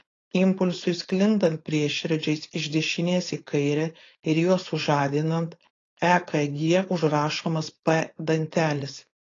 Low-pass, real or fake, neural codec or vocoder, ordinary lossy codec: 7.2 kHz; fake; codec, 16 kHz, 4.8 kbps, FACodec; AAC, 32 kbps